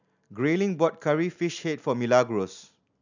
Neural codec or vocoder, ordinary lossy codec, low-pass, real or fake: none; none; 7.2 kHz; real